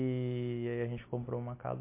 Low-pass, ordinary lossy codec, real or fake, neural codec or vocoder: 3.6 kHz; MP3, 24 kbps; real; none